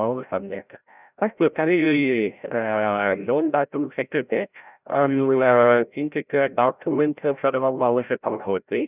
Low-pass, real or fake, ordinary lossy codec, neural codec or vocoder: 3.6 kHz; fake; none; codec, 16 kHz, 0.5 kbps, FreqCodec, larger model